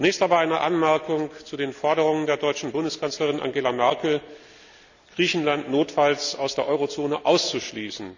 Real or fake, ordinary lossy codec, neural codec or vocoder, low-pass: real; none; none; 7.2 kHz